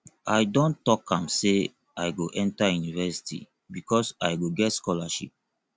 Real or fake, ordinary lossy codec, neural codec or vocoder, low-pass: real; none; none; none